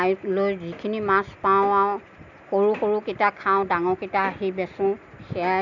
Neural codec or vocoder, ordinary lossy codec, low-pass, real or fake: none; none; 7.2 kHz; real